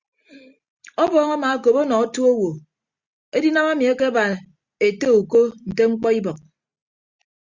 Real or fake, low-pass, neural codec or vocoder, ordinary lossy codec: real; 7.2 kHz; none; Opus, 64 kbps